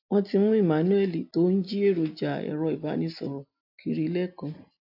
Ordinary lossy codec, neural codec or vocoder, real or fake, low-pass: AAC, 48 kbps; none; real; 5.4 kHz